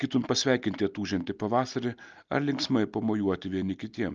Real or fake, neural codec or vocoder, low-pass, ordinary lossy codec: real; none; 7.2 kHz; Opus, 24 kbps